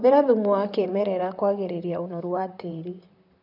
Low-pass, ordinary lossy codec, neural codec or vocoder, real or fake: 5.4 kHz; none; vocoder, 44.1 kHz, 128 mel bands, Pupu-Vocoder; fake